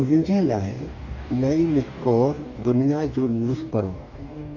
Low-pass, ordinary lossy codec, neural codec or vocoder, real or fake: 7.2 kHz; none; codec, 44.1 kHz, 2.6 kbps, DAC; fake